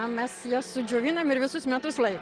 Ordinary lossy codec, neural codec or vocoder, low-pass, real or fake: Opus, 16 kbps; none; 9.9 kHz; real